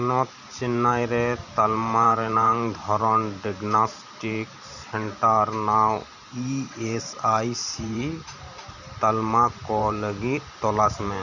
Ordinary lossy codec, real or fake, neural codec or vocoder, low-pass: none; fake; vocoder, 44.1 kHz, 128 mel bands every 512 samples, BigVGAN v2; 7.2 kHz